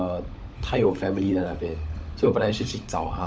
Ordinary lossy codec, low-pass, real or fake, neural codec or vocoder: none; none; fake; codec, 16 kHz, 16 kbps, FunCodec, trained on LibriTTS, 50 frames a second